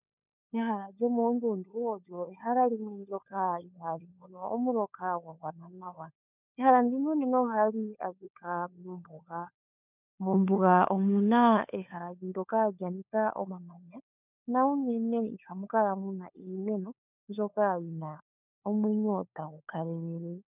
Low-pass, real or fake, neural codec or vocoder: 3.6 kHz; fake; codec, 16 kHz, 4 kbps, FunCodec, trained on LibriTTS, 50 frames a second